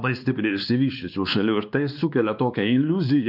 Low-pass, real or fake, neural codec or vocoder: 5.4 kHz; fake; codec, 16 kHz, 2 kbps, X-Codec, WavLM features, trained on Multilingual LibriSpeech